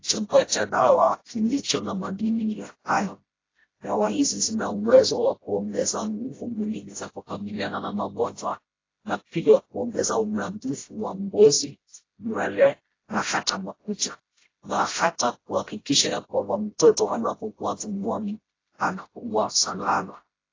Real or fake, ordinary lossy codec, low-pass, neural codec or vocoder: fake; AAC, 32 kbps; 7.2 kHz; codec, 16 kHz, 0.5 kbps, FreqCodec, smaller model